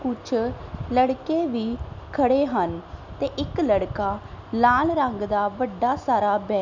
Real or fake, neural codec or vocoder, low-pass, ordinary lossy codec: real; none; 7.2 kHz; none